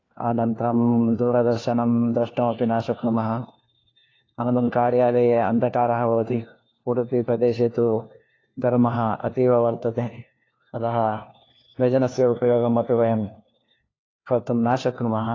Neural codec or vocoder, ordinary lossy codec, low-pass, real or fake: codec, 16 kHz, 1 kbps, FunCodec, trained on LibriTTS, 50 frames a second; AAC, 32 kbps; 7.2 kHz; fake